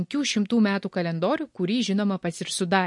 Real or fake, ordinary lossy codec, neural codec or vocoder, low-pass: real; MP3, 48 kbps; none; 10.8 kHz